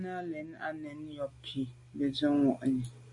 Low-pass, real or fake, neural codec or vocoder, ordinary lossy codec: 10.8 kHz; real; none; MP3, 64 kbps